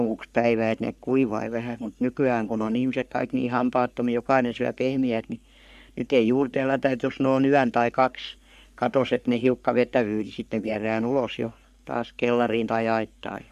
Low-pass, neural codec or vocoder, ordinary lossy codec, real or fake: 14.4 kHz; codec, 44.1 kHz, 3.4 kbps, Pupu-Codec; none; fake